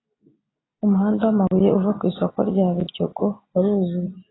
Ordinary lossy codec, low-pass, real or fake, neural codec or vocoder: AAC, 16 kbps; 7.2 kHz; real; none